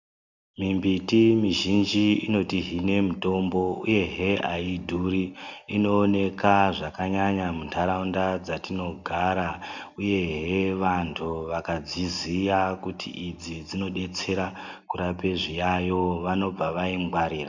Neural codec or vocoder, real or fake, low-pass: none; real; 7.2 kHz